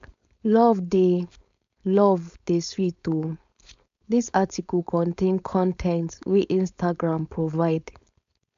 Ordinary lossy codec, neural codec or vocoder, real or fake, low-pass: AAC, 64 kbps; codec, 16 kHz, 4.8 kbps, FACodec; fake; 7.2 kHz